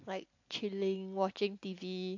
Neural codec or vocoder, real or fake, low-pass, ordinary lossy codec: none; real; 7.2 kHz; AAC, 48 kbps